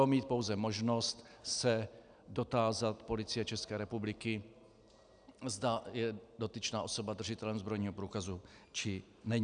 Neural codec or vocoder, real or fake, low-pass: none; real; 9.9 kHz